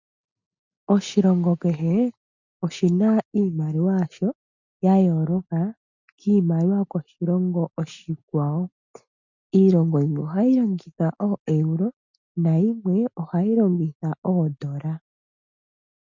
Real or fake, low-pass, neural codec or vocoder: real; 7.2 kHz; none